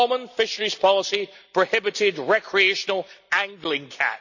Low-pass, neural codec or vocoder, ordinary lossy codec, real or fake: 7.2 kHz; none; none; real